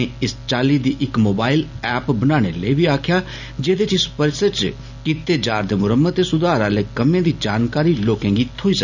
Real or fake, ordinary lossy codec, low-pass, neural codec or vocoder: real; none; 7.2 kHz; none